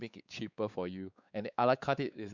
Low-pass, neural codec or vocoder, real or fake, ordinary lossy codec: 7.2 kHz; codec, 16 kHz, 4 kbps, X-Codec, WavLM features, trained on Multilingual LibriSpeech; fake; none